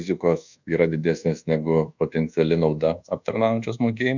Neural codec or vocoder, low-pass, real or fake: codec, 24 kHz, 1.2 kbps, DualCodec; 7.2 kHz; fake